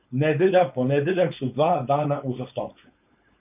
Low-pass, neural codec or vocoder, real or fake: 3.6 kHz; codec, 16 kHz, 4.8 kbps, FACodec; fake